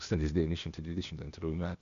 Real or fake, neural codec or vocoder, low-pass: fake; codec, 16 kHz, 0.8 kbps, ZipCodec; 7.2 kHz